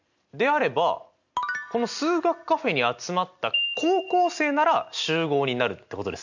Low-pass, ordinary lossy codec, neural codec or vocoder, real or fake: 7.2 kHz; none; none; real